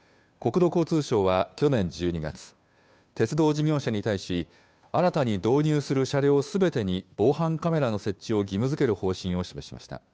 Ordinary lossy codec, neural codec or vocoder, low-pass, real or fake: none; codec, 16 kHz, 2 kbps, FunCodec, trained on Chinese and English, 25 frames a second; none; fake